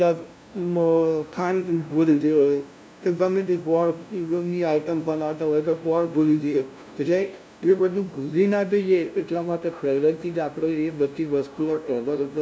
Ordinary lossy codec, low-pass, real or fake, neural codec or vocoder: none; none; fake; codec, 16 kHz, 0.5 kbps, FunCodec, trained on LibriTTS, 25 frames a second